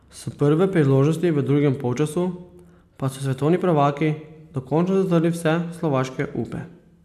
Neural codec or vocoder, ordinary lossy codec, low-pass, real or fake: none; MP3, 96 kbps; 14.4 kHz; real